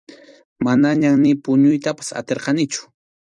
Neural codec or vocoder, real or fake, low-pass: vocoder, 44.1 kHz, 128 mel bands every 256 samples, BigVGAN v2; fake; 10.8 kHz